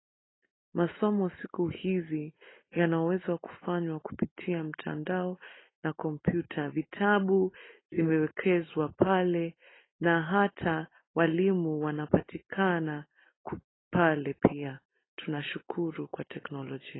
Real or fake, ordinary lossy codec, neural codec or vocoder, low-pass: real; AAC, 16 kbps; none; 7.2 kHz